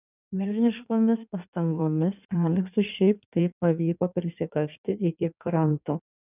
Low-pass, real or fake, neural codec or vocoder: 3.6 kHz; fake; codec, 16 kHz in and 24 kHz out, 1.1 kbps, FireRedTTS-2 codec